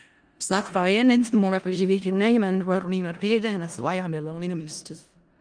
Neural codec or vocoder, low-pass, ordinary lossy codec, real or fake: codec, 16 kHz in and 24 kHz out, 0.4 kbps, LongCat-Audio-Codec, four codebook decoder; 9.9 kHz; Opus, 32 kbps; fake